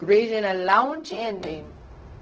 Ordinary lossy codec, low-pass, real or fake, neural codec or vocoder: Opus, 16 kbps; 7.2 kHz; fake; codec, 16 kHz, 0.4 kbps, LongCat-Audio-Codec